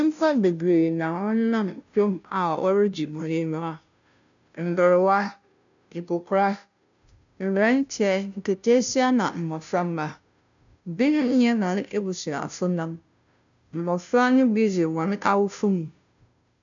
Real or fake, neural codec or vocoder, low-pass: fake; codec, 16 kHz, 0.5 kbps, FunCodec, trained on Chinese and English, 25 frames a second; 7.2 kHz